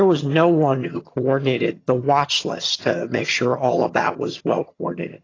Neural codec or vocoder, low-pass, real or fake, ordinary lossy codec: vocoder, 22.05 kHz, 80 mel bands, HiFi-GAN; 7.2 kHz; fake; AAC, 32 kbps